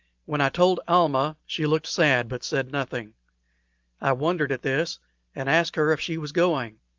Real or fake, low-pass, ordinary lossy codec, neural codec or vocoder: real; 7.2 kHz; Opus, 24 kbps; none